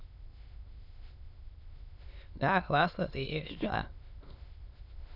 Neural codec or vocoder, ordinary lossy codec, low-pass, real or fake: autoencoder, 22.05 kHz, a latent of 192 numbers a frame, VITS, trained on many speakers; none; 5.4 kHz; fake